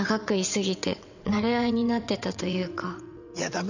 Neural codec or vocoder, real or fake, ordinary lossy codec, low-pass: vocoder, 22.05 kHz, 80 mel bands, WaveNeXt; fake; none; 7.2 kHz